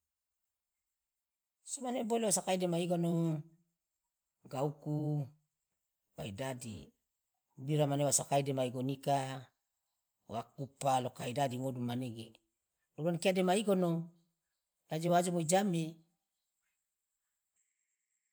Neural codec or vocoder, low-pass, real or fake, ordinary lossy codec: vocoder, 44.1 kHz, 128 mel bands every 512 samples, BigVGAN v2; none; fake; none